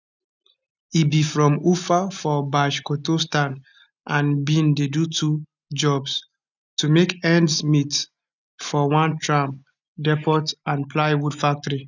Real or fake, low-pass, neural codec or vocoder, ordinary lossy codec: real; 7.2 kHz; none; none